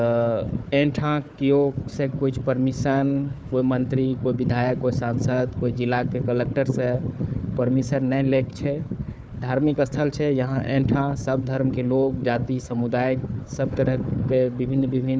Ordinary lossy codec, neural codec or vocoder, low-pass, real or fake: none; codec, 16 kHz, 4 kbps, FunCodec, trained on Chinese and English, 50 frames a second; none; fake